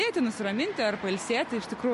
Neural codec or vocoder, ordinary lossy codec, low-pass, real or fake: none; MP3, 48 kbps; 14.4 kHz; real